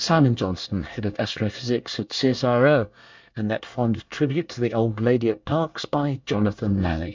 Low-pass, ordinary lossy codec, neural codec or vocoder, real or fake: 7.2 kHz; MP3, 64 kbps; codec, 24 kHz, 1 kbps, SNAC; fake